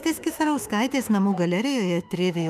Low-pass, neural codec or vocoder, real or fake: 14.4 kHz; autoencoder, 48 kHz, 32 numbers a frame, DAC-VAE, trained on Japanese speech; fake